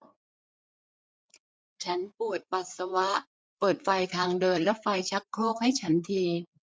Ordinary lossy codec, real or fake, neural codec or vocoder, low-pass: none; fake; codec, 16 kHz, 4 kbps, FreqCodec, larger model; none